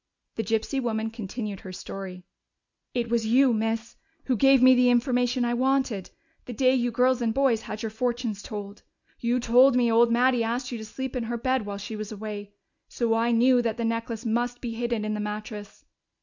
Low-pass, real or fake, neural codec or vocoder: 7.2 kHz; real; none